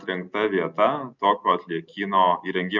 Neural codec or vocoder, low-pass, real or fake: none; 7.2 kHz; real